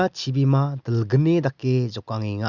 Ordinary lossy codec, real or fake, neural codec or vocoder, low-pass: Opus, 64 kbps; real; none; 7.2 kHz